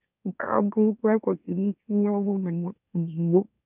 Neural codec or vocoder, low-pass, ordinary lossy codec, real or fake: autoencoder, 44.1 kHz, a latent of 192 numbers a frame, MeloTTS; 3.6 kHz; none; fake